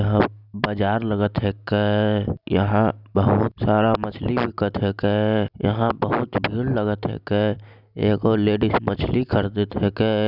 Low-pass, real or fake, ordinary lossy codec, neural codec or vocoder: 5.4 kHz; real; none; none